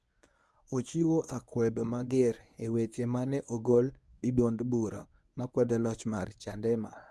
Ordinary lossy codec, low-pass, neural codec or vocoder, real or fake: none; none; codec, 24 kHz, 0.9 kbps, WavTokenizer, medium speech release version 1; fake